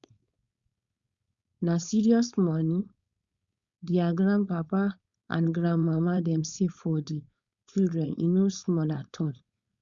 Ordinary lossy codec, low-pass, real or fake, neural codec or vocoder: Opus, 64 kbps; 7.2 kHz; fake; codec, 16 kHz, 4.8 kbps, FACodec